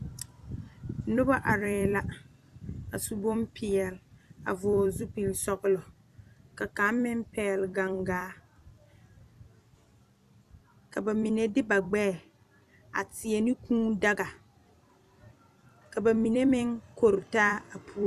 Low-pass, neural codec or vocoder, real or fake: 14.4 kHz; vocoder, 44.1 kHz, 128 mel bands every 256 samples, BigVGAN v2; fake